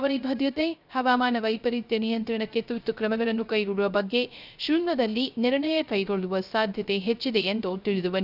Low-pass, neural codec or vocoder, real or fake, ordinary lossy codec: 5.4 kHz; codec, 16 kHz, 0.3 kbps, FocalCodec; fake; none